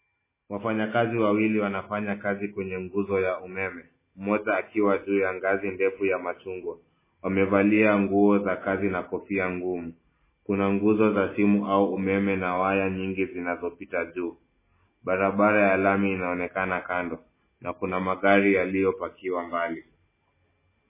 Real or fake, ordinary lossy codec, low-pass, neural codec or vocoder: real; MP3, 16 kbps; 3.6 kHz; none